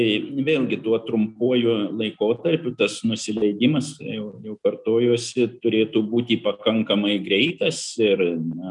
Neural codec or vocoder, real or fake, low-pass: none; real; 10.8 kHz